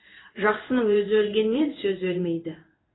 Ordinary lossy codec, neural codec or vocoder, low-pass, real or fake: AAC, 16 kbps; codec, 16 kHz in and 24 kHz out, 1 kbps, XY-Tokenizer; 7.2 kHz; fake